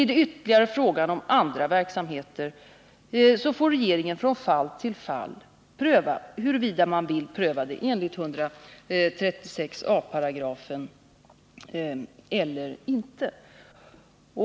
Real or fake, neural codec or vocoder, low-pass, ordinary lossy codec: real; none; none; none